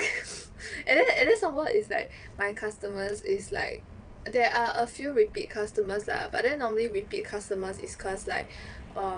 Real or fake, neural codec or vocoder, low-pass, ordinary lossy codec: fake; vocoder, 22.05 kHz, 80 mel bands, WaveNeXt; 9.9 kHz; none